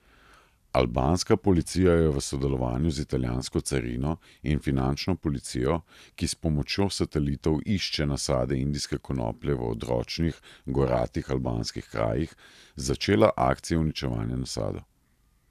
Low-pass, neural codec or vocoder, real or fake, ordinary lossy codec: 14.4 kHz; vocoder, 44.1 kHz, 128 mel bands every 512 samples, BigVGAN v2; fake; none